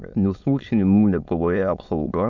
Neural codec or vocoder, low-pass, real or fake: autoencoder, 22.05 kHz, a latent of 192 numbers a frame, VITS, trained on many speakers; 7.2 kHz; fake